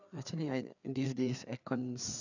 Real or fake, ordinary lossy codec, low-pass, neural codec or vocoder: fake; none; 7.2 kHz; codec, 16 kHz, 4 kbps, FreqCodec, larger model